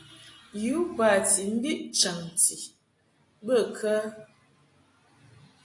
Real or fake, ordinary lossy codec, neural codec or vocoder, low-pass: real; AAC, 48 kbps; none; 10.8 kHz